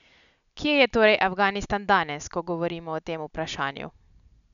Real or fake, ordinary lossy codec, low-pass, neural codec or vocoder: real; none; 7.2 kHz; none